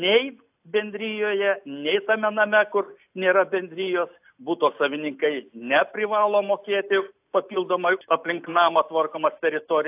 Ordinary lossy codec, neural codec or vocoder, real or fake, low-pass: AAC, 32 kbps; none; real; 3.6 kHz